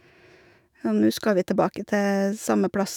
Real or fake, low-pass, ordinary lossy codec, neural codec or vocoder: fake; 19.8 kHz; none; autoencoder, 48 kHz, 128 numbers a frame, DAC-VAE, trained on Japanese speech